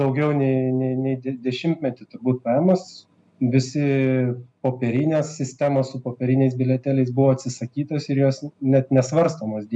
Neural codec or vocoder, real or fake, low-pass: none; real; 10.8 kHz